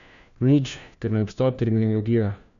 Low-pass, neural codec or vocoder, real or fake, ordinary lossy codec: 7.2 kHz; codec, 16 kHz, 1 kbps, FunCodec, trained on LibriTTS, 50 frames a second; fake; none